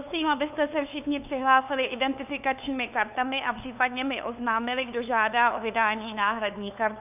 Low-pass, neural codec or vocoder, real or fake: 3.6 kHz; codec, 16 kHz, 2 kbps, FunCodec, trained on LibriTTS, 25 frames a second; fake